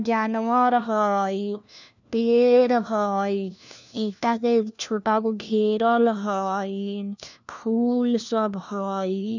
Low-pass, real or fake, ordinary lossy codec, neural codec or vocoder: 7.2 kHz; fake; none; codec, 16 kHz, 1 kbps, FunCodec, trained on LibriTTS, 50 frames a second